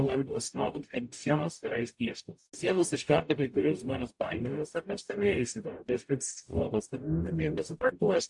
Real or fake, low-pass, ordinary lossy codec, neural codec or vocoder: fake; 10.8 kHz; AAC, 64 kbps; codec, 44.1 kHz, 0.9 kbps, DAC